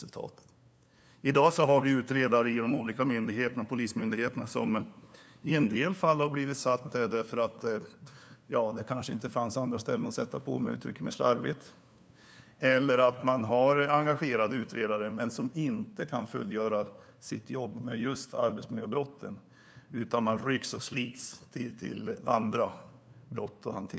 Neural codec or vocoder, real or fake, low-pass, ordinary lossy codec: codec, 16 kHz, 2 kbps, FunCodec, trained on LibriTTS, 25 frames a second; fake; none; none